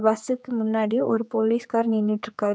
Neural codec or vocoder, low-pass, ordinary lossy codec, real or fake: codec, 16 kHz, 4 kbps, X-Codec, HuBERT features, trained on general audio; none; none; fake